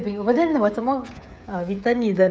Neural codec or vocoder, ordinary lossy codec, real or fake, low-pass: codec, 16 kHz, 16 kbps, FreqCodec, smaller model; none; fake; none